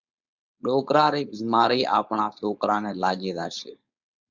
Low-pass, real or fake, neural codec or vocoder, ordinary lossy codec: 7.2 kHz; fake; codec, 16 kHz, 4.8 kbps, FACodec; Opus, 64 kbps